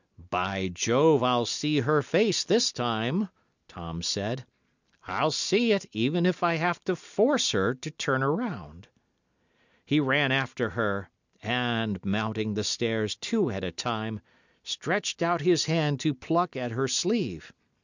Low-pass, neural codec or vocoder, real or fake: 7.2 kHz; none; real